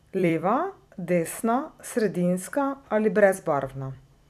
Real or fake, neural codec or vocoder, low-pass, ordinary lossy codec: fake; vocoder, 44.1 kHz, 128 mel bands every 256 samples, BigVGAN v2; 14.4 kHz; none